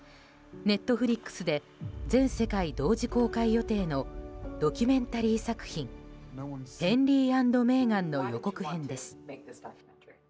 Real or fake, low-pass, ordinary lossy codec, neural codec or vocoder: real; none; none; none